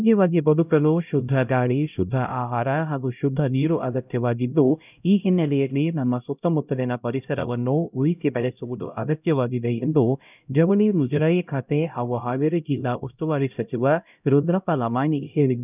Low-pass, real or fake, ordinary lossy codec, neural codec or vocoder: 3.6 kHz; fake; none; codec, 16 kHz, 0.5 kbps, X-Codec, HuBERT features, trained on LibriSpeech